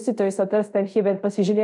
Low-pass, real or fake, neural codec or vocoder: 10.8 kHz; fake; codec, 24 kHz, 0.5 kbps, DualCodec